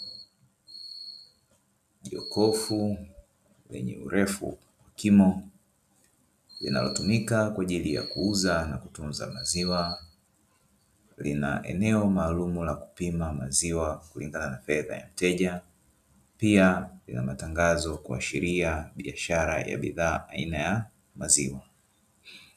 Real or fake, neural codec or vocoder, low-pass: real; none; 14.4 kHz